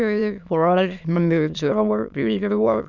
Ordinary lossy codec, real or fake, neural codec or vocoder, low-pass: none; fake; autoencoder, 22.05 kHz, a latent of 192 numbers a frame, VITS, trained on many speakers; 7.2 kHz